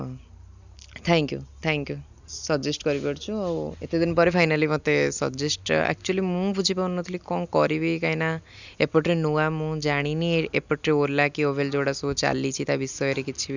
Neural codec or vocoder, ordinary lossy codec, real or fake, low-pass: none; none; real; 7.2 kHz